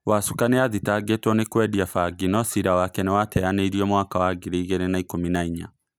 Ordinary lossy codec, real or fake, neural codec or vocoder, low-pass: none; real; none; none